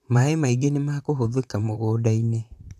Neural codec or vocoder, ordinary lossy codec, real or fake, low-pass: vocoder, 44.1 kHz, 128 mel bands, Pupu-Vocoder; none; fake; 14.4 kHz